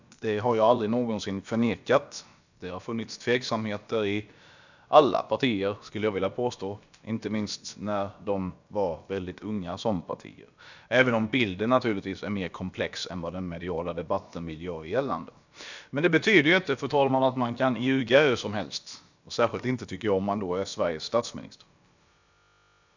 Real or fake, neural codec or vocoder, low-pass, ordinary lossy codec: fake; codec, 16 kHz, about 1 kbps, DyCAST, with the encoder's durations; 7.2 kHz; none